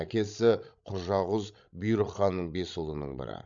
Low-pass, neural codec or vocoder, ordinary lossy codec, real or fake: 7.2 kHz; codec, 16 kHz, 16 kbps, FreqCodec, larger model; MP3, 64 kbps; fake